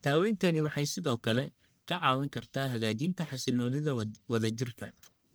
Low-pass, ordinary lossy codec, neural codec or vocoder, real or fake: none; none; codec, 44.1 kHz, 1.7 kbps, Pupu-Codec; fake